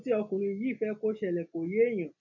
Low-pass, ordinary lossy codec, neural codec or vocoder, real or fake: 7.2 kHz; none; none; real